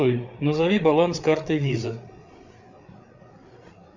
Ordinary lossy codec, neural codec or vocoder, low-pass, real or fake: Opus, 64 kbps; codec, 16 kHz, 8 kbps, FreqCodec, larger model; 7.2 kHz; fake